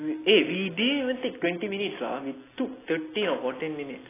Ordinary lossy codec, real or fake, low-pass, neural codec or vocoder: AAC, 16 kbps; real; 3.6 kHz; none